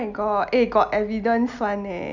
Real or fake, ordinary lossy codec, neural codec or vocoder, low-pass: real; none; none; 7.2 kHz